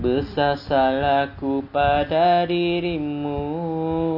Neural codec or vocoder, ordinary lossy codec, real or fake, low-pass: none; none; real; 5.4 kHz